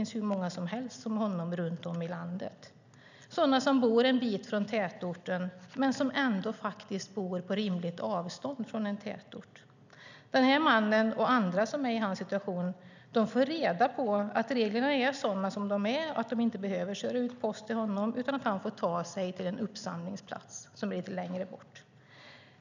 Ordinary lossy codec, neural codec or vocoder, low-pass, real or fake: none; none; 7.2 kHz; real